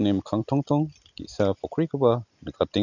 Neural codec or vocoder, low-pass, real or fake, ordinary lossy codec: none; 7.2 kHz; real; none